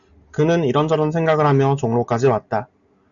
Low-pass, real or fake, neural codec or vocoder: 7.2 kHz; real; none